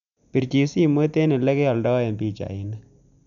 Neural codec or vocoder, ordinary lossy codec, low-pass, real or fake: none; none; 7.2 kHz; real